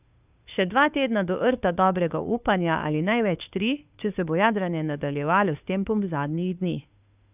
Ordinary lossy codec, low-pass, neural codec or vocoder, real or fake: none; 3.6 kHz; codec, 16 kHz, 2 kbps, FunCodec, trained on Chinese and English, 25 frames a second; fake